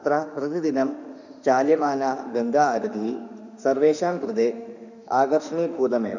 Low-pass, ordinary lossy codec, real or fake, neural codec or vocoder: 7.2 kHz; none; fake; autoencoder, 48 kHz, 32 numbers a frame, DAC-VAE, trained on Japanese speech